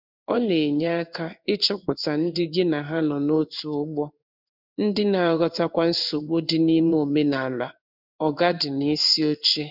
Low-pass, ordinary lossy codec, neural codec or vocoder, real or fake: 5.4 kHz; none; codec, 16 kHz in and 24 kHz out, 1 kbps, XY-Tokenizer; fake